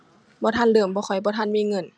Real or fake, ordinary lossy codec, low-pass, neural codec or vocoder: real; none; none; none